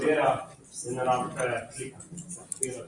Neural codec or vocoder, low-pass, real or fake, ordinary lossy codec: none; 10.8 kHz; real; Opus, 64 kbps